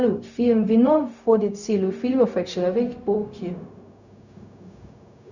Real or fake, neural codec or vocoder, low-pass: fake; codec, 16 kHz, 0.4 kbps, LongCat-Audio-Codec; 7.2 kHz